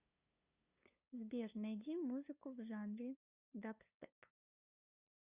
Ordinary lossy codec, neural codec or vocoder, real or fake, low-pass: Opus, 64 kbps; codec, 16 kHz, 2 kbps, FunCodec, trained on LibriTTS, 25 frames a second; fake; 3.6 kHz